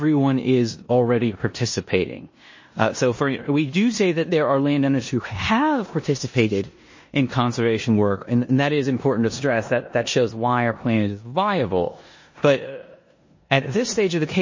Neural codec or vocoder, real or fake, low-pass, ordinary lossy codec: codec, 16 kHz in and 24 kHz out, 0.9 kbps, LongCat-Audio-Codec, four codebook decoder; fake; 7.2 kHz; MP3, 32 kbps